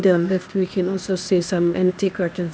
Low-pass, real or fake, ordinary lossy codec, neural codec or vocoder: none; fake; none; codec, 16 kHz, 0.8 kbps, ZipCodec